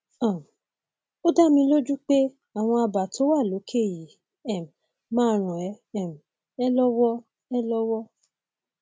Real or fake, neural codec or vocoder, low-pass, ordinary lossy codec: real; none; none; none